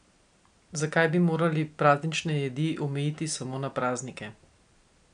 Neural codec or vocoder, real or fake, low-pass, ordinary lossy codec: none; real; 9.9 kHz; none